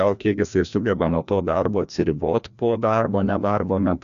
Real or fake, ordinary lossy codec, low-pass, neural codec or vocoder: fake; AAC, 96 kbps; 7.2 kHz; codec, 16 kHz, 1 kbps, FreqCodec, larger model